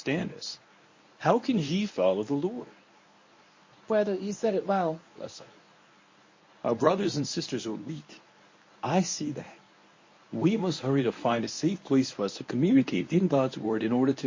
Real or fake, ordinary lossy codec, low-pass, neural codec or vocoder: fake; MP3, 32 kbps; 7.2 kHz; codec, 24 kHz, 0.9 kbps, WavTokenizer, medium speech release version 1